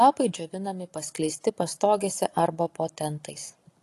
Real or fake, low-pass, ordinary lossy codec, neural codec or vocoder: real; 14.4 kHz; AAC, 48 kbps; none